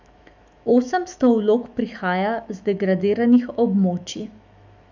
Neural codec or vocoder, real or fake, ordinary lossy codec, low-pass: autoencoder, 48 kHz, 128 numbers a frame, DAC-VAE, trained on Japanese speech; fake; none; 7.2 kHz